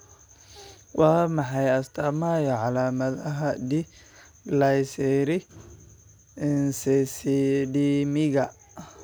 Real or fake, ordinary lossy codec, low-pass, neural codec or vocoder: real; none; none; none